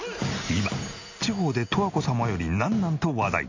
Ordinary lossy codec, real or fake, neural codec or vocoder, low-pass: AAC, 48 kbps; real; none; 7.2 kHz